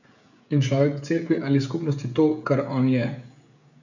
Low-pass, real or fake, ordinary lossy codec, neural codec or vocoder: 7.2 kHz; fake; none; codec, 16 kHz, 16 kbps, FreqCodec, smaller model